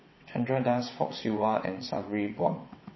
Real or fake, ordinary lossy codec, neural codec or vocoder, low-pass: fake; MP3, 24 kbps; vocoder, 22.05 kHz, 80 mel bands, WaveNeXt; 7.2 kHz